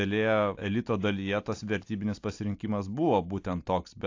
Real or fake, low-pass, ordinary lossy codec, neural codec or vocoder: real; 7.2 kHz; AAC, 48 kbps; none